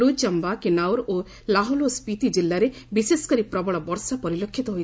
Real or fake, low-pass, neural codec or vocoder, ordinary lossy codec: real; none; none; none